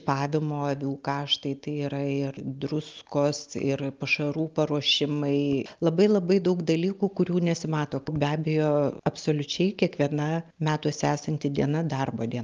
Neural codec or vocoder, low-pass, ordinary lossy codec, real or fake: none; 7.2 kHz; Opus, 32 kbps; real